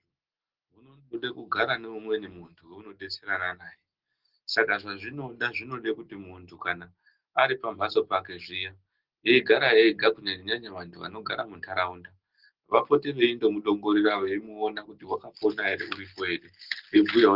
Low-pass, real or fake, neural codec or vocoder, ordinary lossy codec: 5.4 kHz; real; none; Opus, 16 kbps